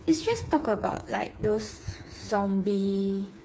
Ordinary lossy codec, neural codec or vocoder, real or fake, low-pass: none; codec, 16 kHz, 4 kbps, FreqCodec, smaller model; fake; none